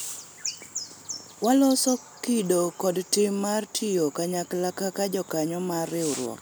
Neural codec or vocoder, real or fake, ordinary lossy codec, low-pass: none; real; none; none